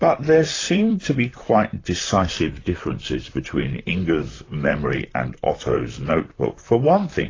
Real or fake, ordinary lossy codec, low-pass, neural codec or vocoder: fake; AAC, 32 kbps; 7.2 kHz; vocoder, 44.1 kHz, 128 mel bands, Pupu-Vocoder